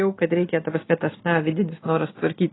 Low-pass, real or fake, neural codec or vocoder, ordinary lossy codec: 7.2 kHz; real; none; AAC, 16 kbps